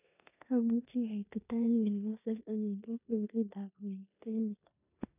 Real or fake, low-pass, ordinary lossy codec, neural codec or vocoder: fake; 3.6 kHz; none; codec, 16 kHz in and 24 kHz out, 0.9 kbps, LongCat-Audio-Codec, four codebook decoder